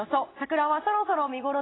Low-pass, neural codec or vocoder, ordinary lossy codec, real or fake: 7.2 kHz; none; AAC, 16 kbps; real